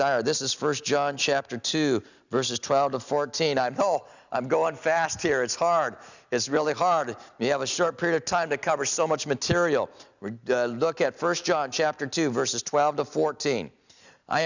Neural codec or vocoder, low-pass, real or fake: none; 7.2 kHz; real